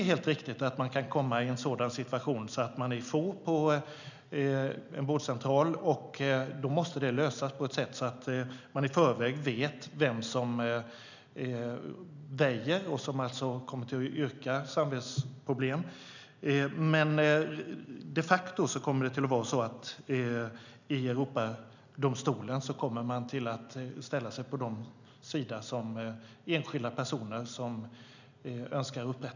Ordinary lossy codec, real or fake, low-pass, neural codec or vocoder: none; real; 7.2 kHz; none